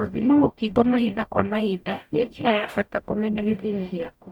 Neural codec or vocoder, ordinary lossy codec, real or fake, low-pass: codec, 44.1 kHz, 0.9 kbps, DAC; none; fake; 19.8 kHz